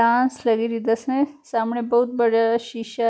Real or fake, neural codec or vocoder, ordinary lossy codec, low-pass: real; none; none; none